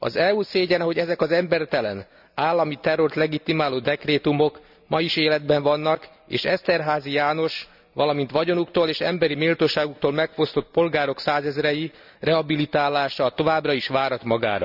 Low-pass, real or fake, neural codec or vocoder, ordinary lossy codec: 5.4 kHz; real; none; none